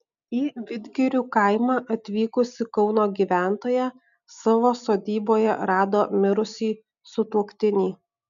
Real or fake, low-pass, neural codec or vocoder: real; 7.2 kHz; none